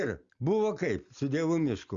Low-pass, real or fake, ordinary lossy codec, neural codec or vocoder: 7.2 kHz; real; Opus, 64 kbps; none